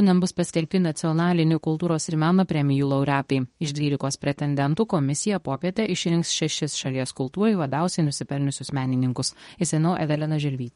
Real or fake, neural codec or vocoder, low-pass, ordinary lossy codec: fake; codec, 24 kHz, 0.9 kbps, WavTokenizer, medium speech release version 2; 10.8 kHz; MP3, 48 kbps